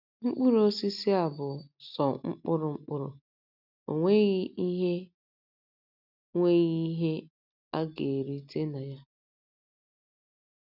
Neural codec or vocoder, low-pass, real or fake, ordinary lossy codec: none; 5.4 kHz; real; none